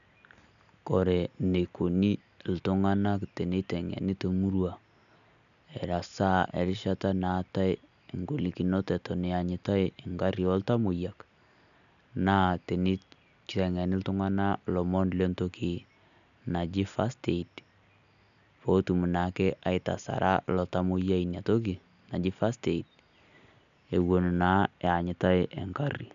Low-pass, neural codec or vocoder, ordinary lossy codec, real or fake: 7.2 kHz; none; none; real